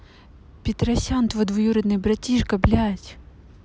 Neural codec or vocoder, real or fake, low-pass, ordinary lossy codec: none; real; none; none